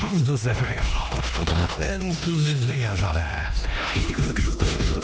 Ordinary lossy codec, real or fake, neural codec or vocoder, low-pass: none; fake; codec, 16 kHz, 1 kbps, X-Codec, HuBERT features, trained on LibriSpeech; none